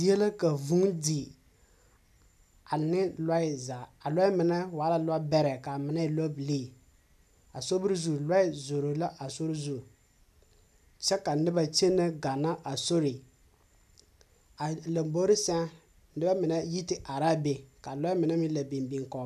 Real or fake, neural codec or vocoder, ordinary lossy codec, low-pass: real; none; MP3, 96 kbps; 14.4 kHz